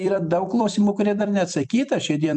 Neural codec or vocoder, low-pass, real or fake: vocoder, 44.1 kHz, 128 mel bands every 256 samples, BigVGAN v2; 10.8 kHz; fake